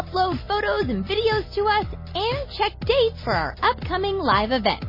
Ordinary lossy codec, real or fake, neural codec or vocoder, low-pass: MP3, 24 kbps; real; none; 5.4 kHz